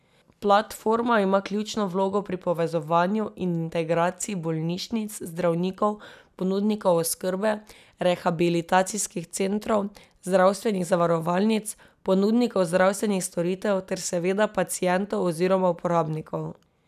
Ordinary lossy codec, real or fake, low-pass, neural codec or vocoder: none; real; 14.4 kHz; none